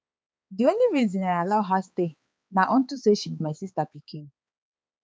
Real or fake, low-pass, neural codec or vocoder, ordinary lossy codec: fake; none; codec, 16 kHz, 4 kbps, X-Codec, HuBERT features, trained on balanced general audio; none